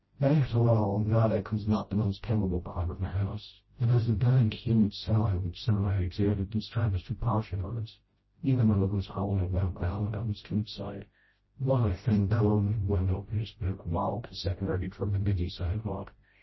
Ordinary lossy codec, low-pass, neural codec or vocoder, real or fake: MP3, 24 kbps; 7.2 kHz; codec, 16 kHz, 0.5 kbps, FreqCodec, smaller model; fake